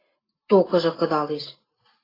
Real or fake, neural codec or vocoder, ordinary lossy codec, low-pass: real; none; AAC, 24 kbps; 5.4 kHz